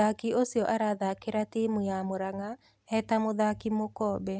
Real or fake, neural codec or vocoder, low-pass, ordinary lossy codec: real; none; none; none